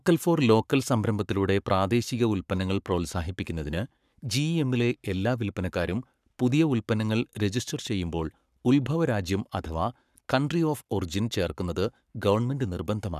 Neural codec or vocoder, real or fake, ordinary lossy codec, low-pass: codec, 44.1 kHz, 7.8 kbps, Pupu-Codec; fake; none; 14.4 kHz